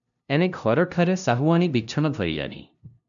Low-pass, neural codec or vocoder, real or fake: 7.2 kHz; codec, 16 kHz, 0.5 kbps, FunCodec, trained on LibriTTS, 25 frames a second; fake